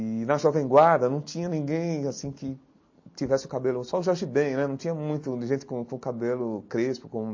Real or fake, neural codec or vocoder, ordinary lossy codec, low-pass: real; none; MP3, 32 kbps; 7.2 kHz